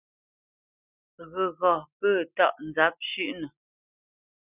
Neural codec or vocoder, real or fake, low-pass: none; real; 3.6 kHz